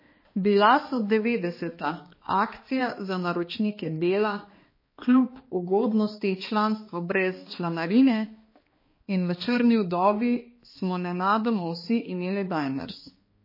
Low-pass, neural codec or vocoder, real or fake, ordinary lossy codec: 5.4 kHz; codec, 16 kHz, 2 kbps, X-Codec, HuBERT features, trained on balanced general audio; fake; MP3, 24 kbps